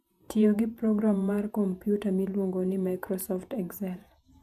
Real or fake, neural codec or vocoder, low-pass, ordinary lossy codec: fake; vocoder, 48 kHz, 128 mel bands, Vocos; 14.4 kHz; none